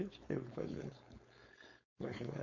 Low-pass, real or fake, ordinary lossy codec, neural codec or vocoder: 7.2 kHz; fake; MP3, 48 kbps; codec, 16 kHz, 4.8 kbps, FACodec